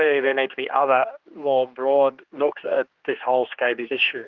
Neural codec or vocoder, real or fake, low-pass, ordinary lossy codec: codec, 16 kHz, 2 kbps, X-Codec, HuBERT features, trained on general audio; fake; 7.2 kHz; Opus, 32 kbps